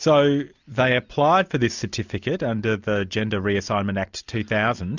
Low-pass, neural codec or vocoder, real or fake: 7.2 kHz; none; real